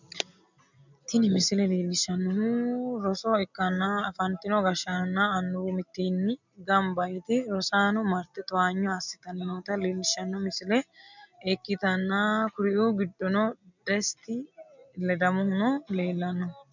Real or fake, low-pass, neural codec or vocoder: real; 7.2 kHz; none